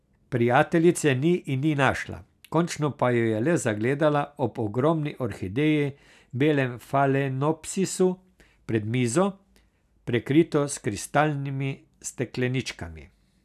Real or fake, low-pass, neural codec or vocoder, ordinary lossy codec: real; 14.4 kHz; none; none